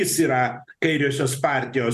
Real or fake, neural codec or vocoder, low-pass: fake; vocoder, 44.1 kHz, 128 mel bands every 256 samples, BigVGAN v2; 14.4 kHz